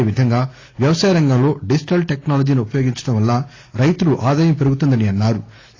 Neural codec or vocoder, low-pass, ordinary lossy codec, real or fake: none; 7.2 kHz; AAC, 32 kbps; real